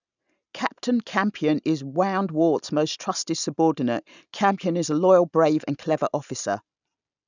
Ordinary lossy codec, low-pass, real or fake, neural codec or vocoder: none; 7.2 kHz; real; none